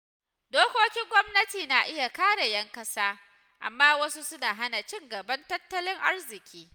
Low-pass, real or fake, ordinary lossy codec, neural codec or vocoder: none; real; none; none